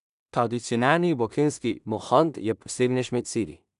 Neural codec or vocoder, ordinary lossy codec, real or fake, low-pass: codec, 16 kHz in and 24 kHz out, 0.4 kbps, LongCat-Audio-Codec, two codebook decoder; none; fake; 10.8 kHz